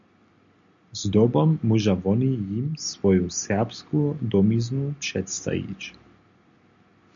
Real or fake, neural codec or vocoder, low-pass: real; none; 7.2 kHz